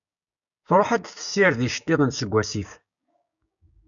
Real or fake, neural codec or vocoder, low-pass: fake; codec, 16 kHz, 6 kbps, DAC; 7.2 kHz